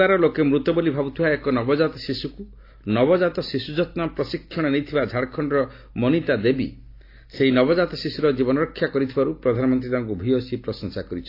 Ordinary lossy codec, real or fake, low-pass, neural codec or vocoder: AAC, 32 kbps; real; 5.4 kHz; none